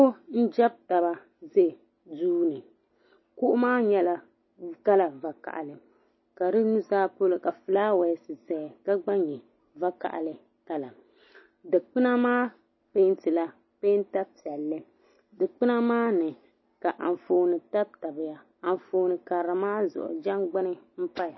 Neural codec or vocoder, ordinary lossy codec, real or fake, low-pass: none; MP3, 24 kbps; real; 7.2 kHz